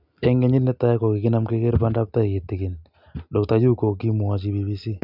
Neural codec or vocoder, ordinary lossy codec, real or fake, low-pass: none; none; real; 5.4 kHz